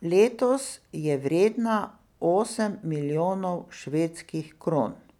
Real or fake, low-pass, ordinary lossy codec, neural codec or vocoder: real; 19.8 kHz; none; none